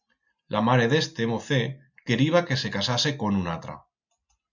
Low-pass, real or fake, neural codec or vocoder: 7.2 kHz; real; none